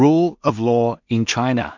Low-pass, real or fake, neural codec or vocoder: 7.2 kHz; fake; codec, 16 kHz in and 24 kHz out, 0.4 kbps, LongCat-Audio-Codec, two codebook decoder